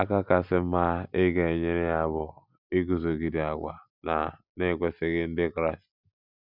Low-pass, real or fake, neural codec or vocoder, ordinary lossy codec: 5.4 kHz; real; none; none